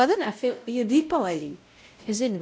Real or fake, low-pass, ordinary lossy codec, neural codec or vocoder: fake; none; none; codec, 16 kHz, 0.5 kbps, X-Codec, WavLM features, trained on Multilingual LibriSpeech